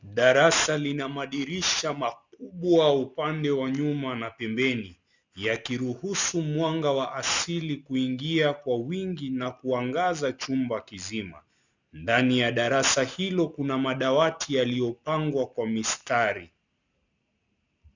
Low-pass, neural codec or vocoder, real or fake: 7.2 kHz; none; real